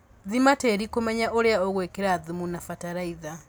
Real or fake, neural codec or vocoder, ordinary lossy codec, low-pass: real; none; none; none